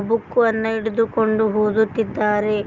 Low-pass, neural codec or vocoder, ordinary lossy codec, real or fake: 7.2 kHz; none; Opus, 24 kbps; real